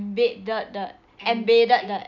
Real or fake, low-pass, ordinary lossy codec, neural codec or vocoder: real; 7.2 kHz; none; none